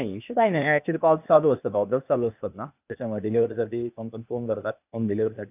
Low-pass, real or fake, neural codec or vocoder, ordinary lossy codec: 3.6 kHz; fake; codec, 16 kHz, 0.8 kbps, ZipCodec; none